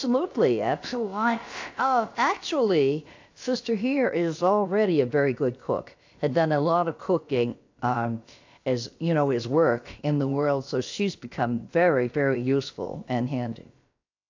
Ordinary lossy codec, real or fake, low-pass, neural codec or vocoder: AAC, 48 kbps; fake; 7.2 kHz; codec, 16 kHz, about 1 kbps, DyCAST, with the encoder's durations